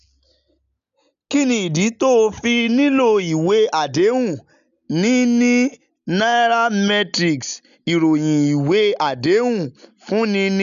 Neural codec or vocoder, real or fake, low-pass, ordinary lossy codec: none; real; 7.2 kHz; none